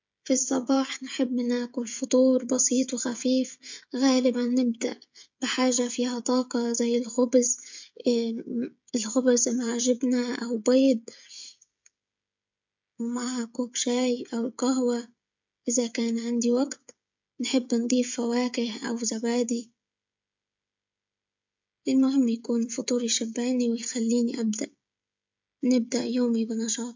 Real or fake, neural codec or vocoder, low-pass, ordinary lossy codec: fake; codec, 16 kHz, 16 kbps, FreqCodec, smaller model; 7.2 kHz; MP3, 64 kbps